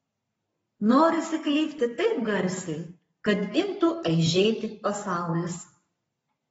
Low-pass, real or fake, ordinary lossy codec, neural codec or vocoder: 19.8 kHz; fake; AAC, 24 kbps; codec, 44.1 kHz, 7.8 kbps, Pupu-Codec